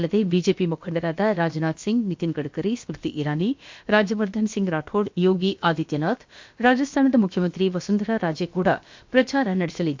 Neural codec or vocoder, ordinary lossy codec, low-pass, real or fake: codec, 16 kHz, about 1 kbps, DyCAST, with the encoder's durations; MP3, 48 kbps; 7.2 kHz; fake